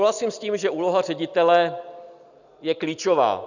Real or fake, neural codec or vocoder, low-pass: real; none; 7.2 kHz